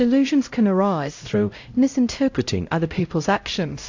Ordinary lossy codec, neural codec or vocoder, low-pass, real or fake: AAC, 48 kbps; codec, 16 kHz, 0.5 kbps, X-Codec, HuBERT features, trained on LibriSpeech; 7.2 kHz; fake